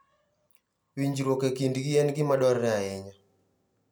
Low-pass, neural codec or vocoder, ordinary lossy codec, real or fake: none; none; none; real